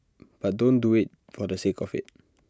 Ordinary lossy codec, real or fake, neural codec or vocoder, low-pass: none; real; none; none